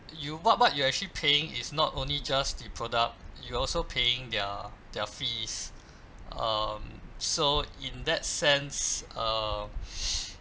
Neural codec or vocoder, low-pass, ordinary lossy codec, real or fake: none; none; none; real